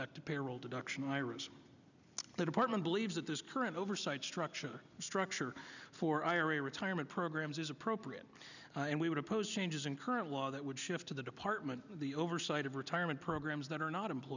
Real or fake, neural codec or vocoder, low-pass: real; none; 7.2 kHz